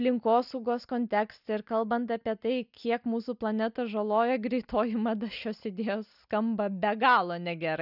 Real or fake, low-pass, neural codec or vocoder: real; 5.4 kHz; none